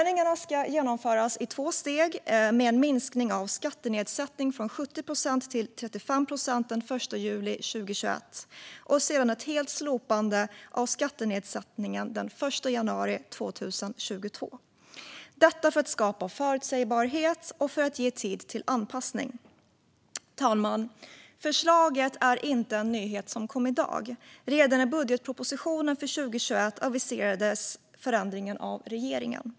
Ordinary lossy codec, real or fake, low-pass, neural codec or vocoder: none; real; none; none